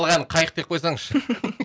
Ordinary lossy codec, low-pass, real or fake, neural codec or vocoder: none; none; real; none